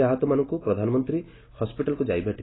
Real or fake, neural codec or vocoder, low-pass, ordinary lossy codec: real; none; 7.2 kHz; AAC, 16 kbps